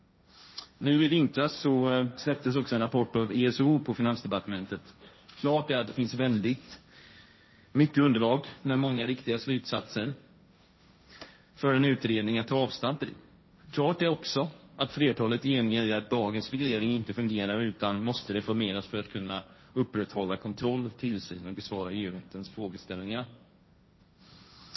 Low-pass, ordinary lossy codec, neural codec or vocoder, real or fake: 7.2 kHz; MP3, 24 kbps; codec, 16 kHz, 1.1 kbps, Voila-Tokenizer; fake